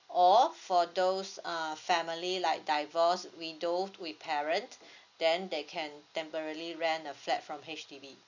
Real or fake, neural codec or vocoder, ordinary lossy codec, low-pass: real; none; none; 7.2 kHz